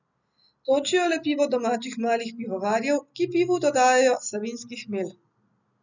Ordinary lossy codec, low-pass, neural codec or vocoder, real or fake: none; 7.2 kHz; none; real